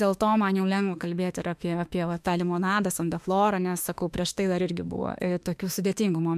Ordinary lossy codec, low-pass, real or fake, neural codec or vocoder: MP3, 96 kbps; 14.4 kHz; fake; autoencoder, 48 kHz, 32 numbers a frame, DAC-VAE, trained on Japanese speech